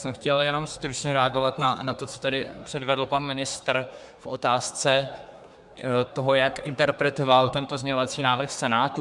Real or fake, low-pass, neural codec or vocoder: fake; 10.8 kHz; codec, 24 kHz, 1 kbps, SNAC